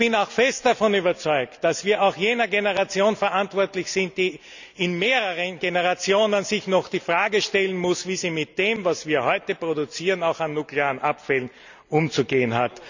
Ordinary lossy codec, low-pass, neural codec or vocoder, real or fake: none; 7.2 kHz; none; real